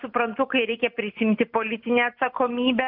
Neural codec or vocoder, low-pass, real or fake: none; 5.4 kHz; real